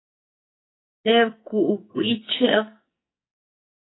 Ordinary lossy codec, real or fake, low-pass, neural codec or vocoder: AAC, 16 kbps; fake; 7.2 kHz; codec, 44.1 kHz, 2.6 kbps, SNAC